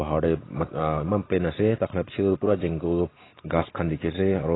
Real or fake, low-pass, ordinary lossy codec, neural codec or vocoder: real; 7.2 kHz; AAC, 16 kbps; none